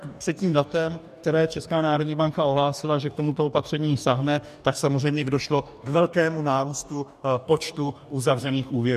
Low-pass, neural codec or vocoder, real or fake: 14.4 kHz; codec, 44.1 kHz, 2.6 kbps, DAC; fake